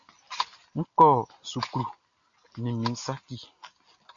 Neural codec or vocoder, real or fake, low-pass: none; real; 7.2 kHz